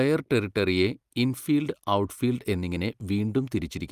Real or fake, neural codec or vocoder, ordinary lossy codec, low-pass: real; none; Opus, 32 kbps; 14.4 kHz